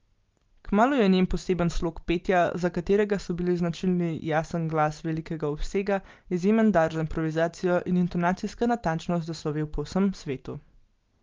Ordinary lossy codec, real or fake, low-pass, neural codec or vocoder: Opus, 32 kbps; real; 7.2 kHz; none